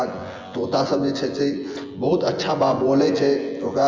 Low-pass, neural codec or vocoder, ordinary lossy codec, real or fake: 7.2 kHz; vocoder, 24 kHz, 100 mel bands, Vocos; Opus, 32 kbps; fake